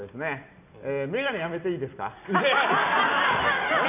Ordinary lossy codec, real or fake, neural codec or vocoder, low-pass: none; real; none; 3.6 kHz